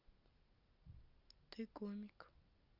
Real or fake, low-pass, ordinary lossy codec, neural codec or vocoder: real; 5.4 kHz; Opus, 64 kbps; none